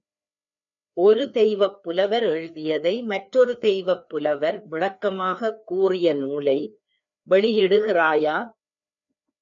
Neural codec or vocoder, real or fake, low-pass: codec, 16 kHz, 2 kbps, FreqCodec, larger model; fake; 7.2 kHz